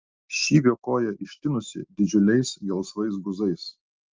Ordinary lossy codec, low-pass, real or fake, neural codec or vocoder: Opus, 24 kbps; 7.2 kHz; real; none